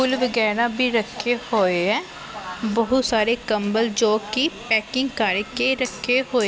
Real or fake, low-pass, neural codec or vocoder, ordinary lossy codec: real; none; none; none